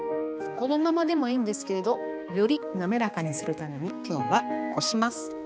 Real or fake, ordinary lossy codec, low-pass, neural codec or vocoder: fake; none; none; codec, 16 kHz, 2 kbps, X-Codec, HuBERT features, trained on balanced general audio